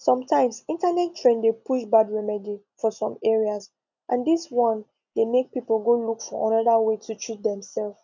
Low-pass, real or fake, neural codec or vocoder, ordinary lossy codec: 7.2 kHz; real; none; none